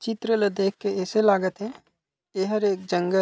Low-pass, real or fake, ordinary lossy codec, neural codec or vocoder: none; real; none; none